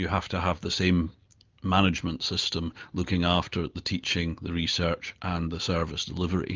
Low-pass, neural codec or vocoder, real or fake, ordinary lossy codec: 7.2 kHz; none; real; Opus, 24 kbps